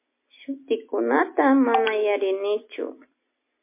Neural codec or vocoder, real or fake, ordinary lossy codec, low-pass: none; real; MP3, 24 kbps; 3.6 kHz